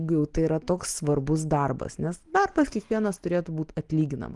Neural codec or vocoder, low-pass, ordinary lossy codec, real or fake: none; 10.8 kHz; Opus, 24 kbps; real